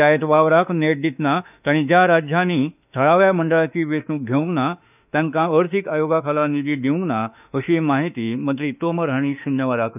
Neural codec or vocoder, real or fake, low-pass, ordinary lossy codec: autoencoder, 48 kHz, 32 numbers a frame, DAC-VAE, trained on Japanese speech; fake; 3.6 kHz; none